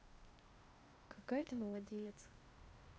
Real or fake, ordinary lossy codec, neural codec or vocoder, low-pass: fake; none; codec, 16 kHz, 0.8 kbps, ZipCodec; none